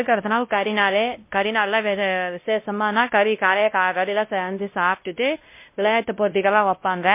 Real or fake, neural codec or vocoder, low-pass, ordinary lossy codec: fake; codec, 16 kHz, 0.5 kbps, X-Codec, WavLM features, trained on Multilingual LibriSpeech; 3.6 kHz; MP3, 24 kbps